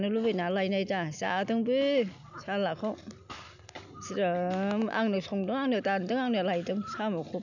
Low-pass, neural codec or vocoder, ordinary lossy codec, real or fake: 7.2 kHz; none; none; real